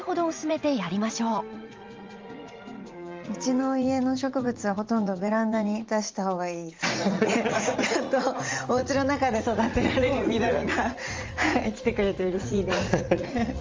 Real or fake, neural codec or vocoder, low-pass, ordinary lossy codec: fake; vocoder, 44.1 kHz, 80 mel bands, Vocos; 7.2 kHz; Opus, 32 kbps